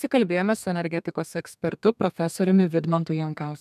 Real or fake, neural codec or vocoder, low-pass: fake; codec, 32 kHz, 1.9 kbps, SNAC; 14.4 kHz